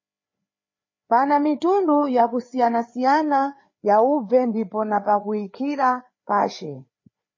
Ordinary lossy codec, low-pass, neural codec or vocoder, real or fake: MP3, 32 kbps; 7.2 kHz; codec, 16 kHz, 4 kbps, FreqCodec, larger model; fake